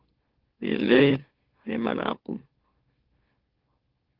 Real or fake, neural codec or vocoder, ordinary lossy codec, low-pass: fake; autoencoder, 44.1 kHz, a latent of 192 numbers a frame, MeloTTS; Opus, 16 kbps; 5.4 kHz